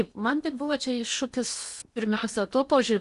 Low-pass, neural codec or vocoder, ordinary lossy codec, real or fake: 10.8 kHz; codec, 16 kHz in and 24 kHz out, 0.8 kbps, FocalCodec, streaming, 65536 codes; Opus, 64 kbps; fake